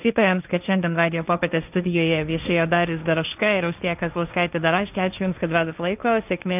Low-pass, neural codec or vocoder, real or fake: 3.6 kHz; codec, 16 kHz, 1.1 kbps, Voila-Tokenizer; fake